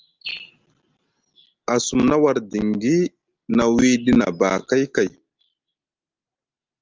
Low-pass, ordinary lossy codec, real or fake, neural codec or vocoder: 7.2 kHz; Opus, 32 kbps; real; none